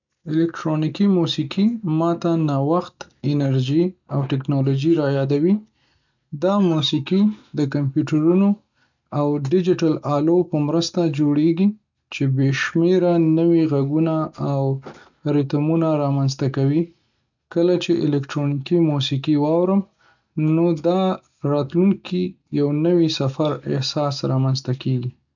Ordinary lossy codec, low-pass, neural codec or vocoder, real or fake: none; 7.2 kHz; none; real